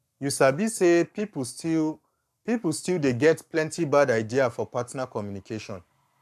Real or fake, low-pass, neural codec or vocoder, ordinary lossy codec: fake; 14.4 kHz; codec, 44.1 kHz, 7.8 kbps, Pupu-Codec; none